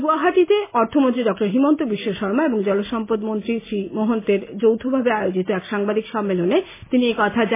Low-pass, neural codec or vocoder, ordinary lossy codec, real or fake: 3.6 kHz; none; MP3, 16 kbps; real